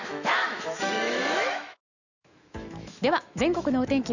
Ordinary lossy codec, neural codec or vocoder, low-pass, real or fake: none; autoencoder, 48 kHz, 128 numbers a frame, DAC-VAE, trained on Japanese speech; 7.2 kHz; fake